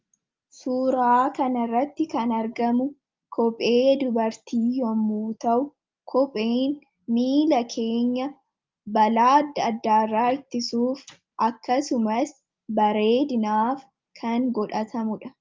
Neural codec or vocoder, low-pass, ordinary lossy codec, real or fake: none; 7.2 kHz; Opus, 32 kbps; real